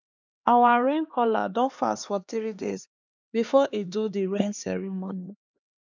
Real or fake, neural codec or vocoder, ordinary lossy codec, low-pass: fake; codec, 16 kHz, 2 kbps, X-Codec, HuBERT features, trained on LibriSpeech; none; 7.2 kHz